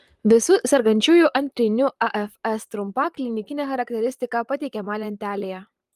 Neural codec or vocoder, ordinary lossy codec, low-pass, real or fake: vocoder, 44.1 kHz, 128 mel bands, Pupu-Vocoder; Opus, 32 kbps; 14.4 kHz; fake